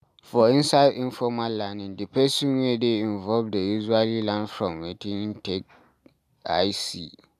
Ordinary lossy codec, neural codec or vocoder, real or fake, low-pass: none; none; real; 14.4 kHz